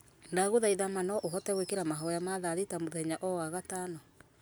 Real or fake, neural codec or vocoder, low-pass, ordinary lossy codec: real; none; none; none